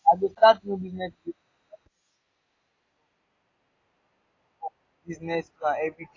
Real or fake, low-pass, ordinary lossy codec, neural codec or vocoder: real; 7.2 kHz; AAC, 32 kbps; none